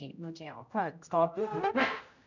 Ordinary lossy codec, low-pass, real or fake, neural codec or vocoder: none; 7.2 kHz; fake; codec, 16 kHz, 0.5 kbps, X-Codec, HuBERT features, trained on general audio